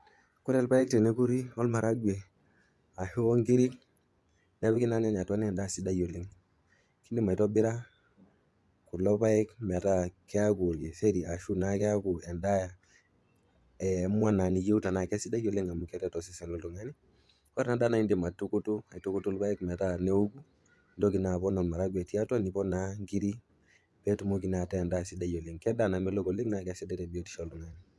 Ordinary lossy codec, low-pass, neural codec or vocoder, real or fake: none; none; vocoder, 24 kHz, 100 mel bands, Vocos; fake